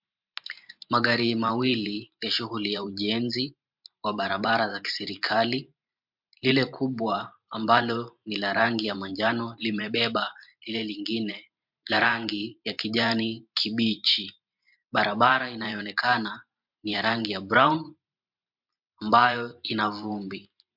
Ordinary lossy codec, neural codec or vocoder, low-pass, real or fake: MP3, 48 kbps; vocoder, 44.1 kHz, 128 mel bands every 512 samples, BigVGAN v2; 5.4 kHz; fake